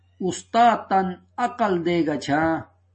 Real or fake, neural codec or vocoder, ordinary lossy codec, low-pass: real; none; MP3, 32 kbps; 10.8 kHz